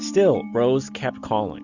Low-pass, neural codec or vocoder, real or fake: 7.2 kHz; none; real